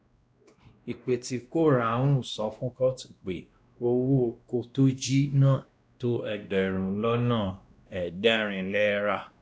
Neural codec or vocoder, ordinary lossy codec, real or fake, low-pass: codec, 16 kHz, 1 kbps, X-Codec, WavLM features, trained on Multilingual LibriSpeech; none; fake; none